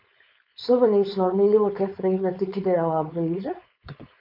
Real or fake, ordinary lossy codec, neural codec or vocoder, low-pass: fake; MP3, 48 kbps; codec, 16 kHz, 4.8 kbps, FACodec; 5.4 kHz